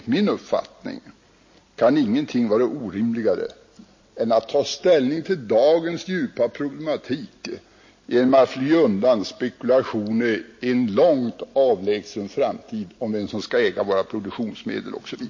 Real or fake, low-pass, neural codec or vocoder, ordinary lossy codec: real; 7.2 kHz; none; MP3, 32 kbps